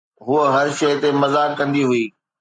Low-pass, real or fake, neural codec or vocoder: 9.9 kHz; real; none